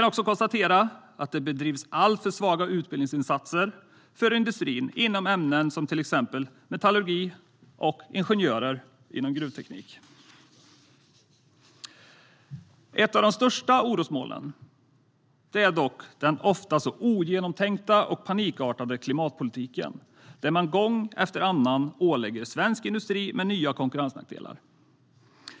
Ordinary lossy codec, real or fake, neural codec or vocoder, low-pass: none; real; none; none